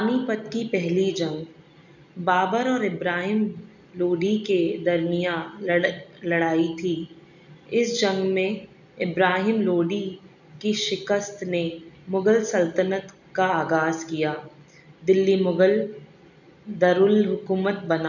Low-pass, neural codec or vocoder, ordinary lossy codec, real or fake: 7.2 kHz; none; none; real